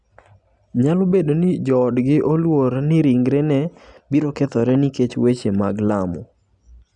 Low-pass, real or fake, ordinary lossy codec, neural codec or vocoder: 10.8 kHz; real; none; none